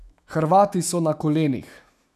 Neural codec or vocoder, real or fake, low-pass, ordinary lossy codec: autoencoder, 48 kHz, 128 numbers a frame, DAC-VAE, trained on Japanese speech; fake; 14.4 kHz; none